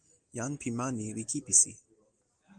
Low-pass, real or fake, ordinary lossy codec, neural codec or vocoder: 9.9 kHz; real; Opus, 24 kbps; none